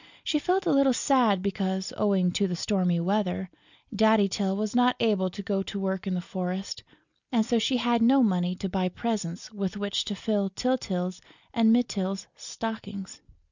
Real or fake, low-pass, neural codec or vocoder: real; 7.2 kHz; none